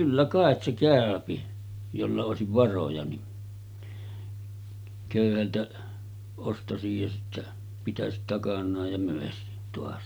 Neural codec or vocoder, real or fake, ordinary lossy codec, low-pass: none; real; none; none